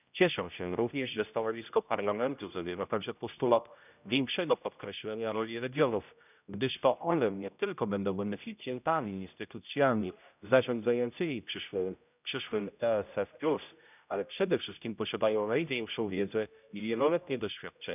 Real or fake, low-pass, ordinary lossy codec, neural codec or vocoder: fake; 3.6 kHz; none; codec, 16 kHz, 0.5 kbps, X-Codec, HuBERT features, trained on general audio